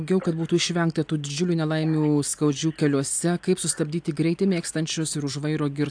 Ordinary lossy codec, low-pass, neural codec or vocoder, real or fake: AAC, 64 kbps; 9.9 kHz; none; real